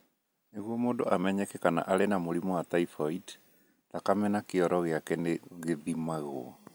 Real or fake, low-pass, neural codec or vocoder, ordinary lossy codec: real; none; none; none